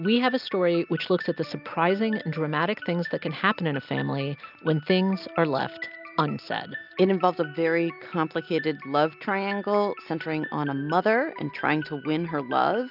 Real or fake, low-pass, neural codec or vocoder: real; 5.4 kHz; none